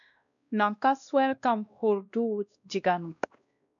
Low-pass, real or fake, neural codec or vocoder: 7.2 kHz; fake; codec, 16 kHz, 1 kbps, X-Codec, WavLM features, trained on Multilingual LibriSpeech